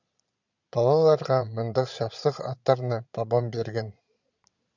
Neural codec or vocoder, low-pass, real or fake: vocoder, 22.05 kHz, 80 mel bands, Vocos; 7.2 kHz; fake